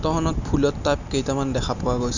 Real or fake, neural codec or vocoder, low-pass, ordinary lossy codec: real; none; 7.2 kHz; none